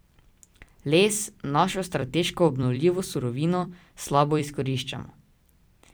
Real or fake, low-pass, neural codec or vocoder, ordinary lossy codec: fake; none; vocoder, 44.1 kHz, 128 mel bands every 256 samples, BigVGAN v2; none